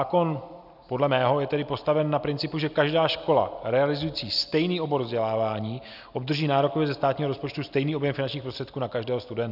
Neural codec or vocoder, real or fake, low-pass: none; real; 5.4 kHz